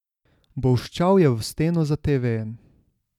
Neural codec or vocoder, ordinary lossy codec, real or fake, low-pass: none; none; real; 19.8 kHz